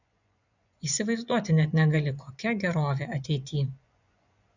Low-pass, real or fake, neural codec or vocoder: 7.2 kHz; fake; vocoder, 24 kHz, 100 mel bands, Vocos